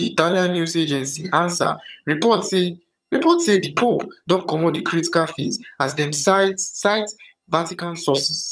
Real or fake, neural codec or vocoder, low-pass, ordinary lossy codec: fake; vocoder, 22.05 kHz, 80 mel bands, HiFi-GAN; none; none